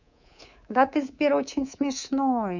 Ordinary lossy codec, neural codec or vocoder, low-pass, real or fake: none; codec, 24 kHz, 3.1 kbps, DualCodec; 7.2 kHz; fake